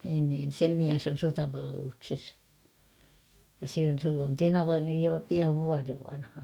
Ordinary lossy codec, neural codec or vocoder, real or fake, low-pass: none; codec, 44.1 kHz, 2.6 kbps, DAC; fake; 19.8 kHz